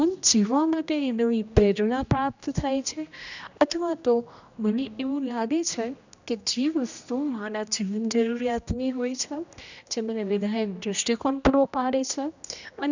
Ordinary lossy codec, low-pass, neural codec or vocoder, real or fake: none; 7.2 kHz; codec, 16 kHz, 1 kbps, X-Codec, HuBERT features, trained on general audio; fake